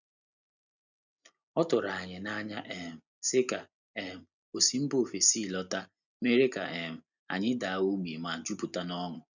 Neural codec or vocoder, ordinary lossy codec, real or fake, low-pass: none; none; real; 7.2 kHz